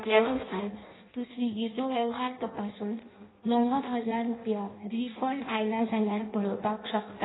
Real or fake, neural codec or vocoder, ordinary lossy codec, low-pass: fake; codec, 16 kHz in and 24 kHz out, 0.6 kbps, FireRedTTS-2 codec; AAC, 16 kbps; 7.2 kHz